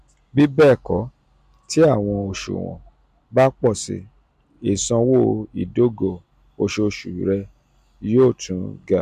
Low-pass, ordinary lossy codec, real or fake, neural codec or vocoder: 14.4 kHz; MP3, 96 kbps; real; none